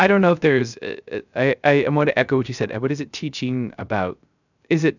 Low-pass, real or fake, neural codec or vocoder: 7.2 kHz; fake; codec, 16 kHz, 0.3 kbps, FocalCodec